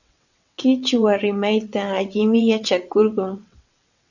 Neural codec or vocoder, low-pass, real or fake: vocoder, 22.05 kHz, 80 mel bands, WaveNeXt; 7.2 kHz; fake